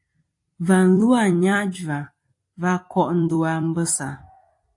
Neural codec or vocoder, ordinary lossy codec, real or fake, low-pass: vocoder, 44.1 kHz, 128 mel bands every 256 samples, BigVGAN v2; AAC, 48 kbps; fake; 10.8 kHz